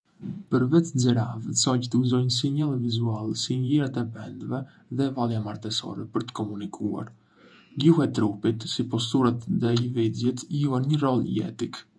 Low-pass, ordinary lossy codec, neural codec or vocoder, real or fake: 9.9 kHz; none; vocoder, 48 kHz, 128 mel bands, Vocos; fake